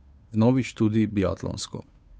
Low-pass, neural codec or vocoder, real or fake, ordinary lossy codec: none; codec, 16 kHz, 2 kbps, FunCodec, trained on Chinese and English, 25 frames a second; fake; none